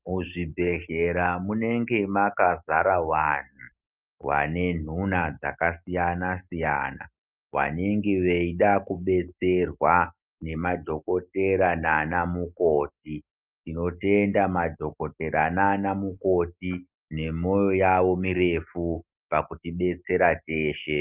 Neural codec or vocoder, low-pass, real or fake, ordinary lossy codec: none; 3.6 kHz; real; Opus, 24 kbps